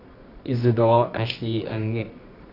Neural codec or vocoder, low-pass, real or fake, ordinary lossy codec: codec, 24 kHz, 0.9 kbps, WavTokenizer, medium music audio release; 5.4 kHz; fake; none